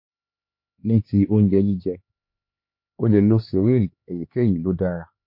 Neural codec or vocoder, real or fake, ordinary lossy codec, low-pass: codec, 16 kHz, 4 kbps, X-Codec, HuBERT features, trained on LibriSpeech; fake; MP3, 32 kbps; 5.4 kHz